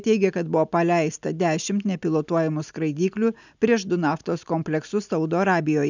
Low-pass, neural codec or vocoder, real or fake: 7.2 kHz; none; real